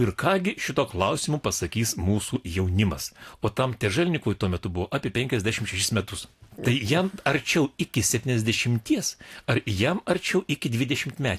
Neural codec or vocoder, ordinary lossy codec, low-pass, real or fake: none; AAC, 64 kbps; 14.4 kHz; real